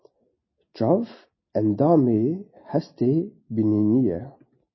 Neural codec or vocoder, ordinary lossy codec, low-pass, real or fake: none; MP3, 24 kbps; 7.2 kHz; real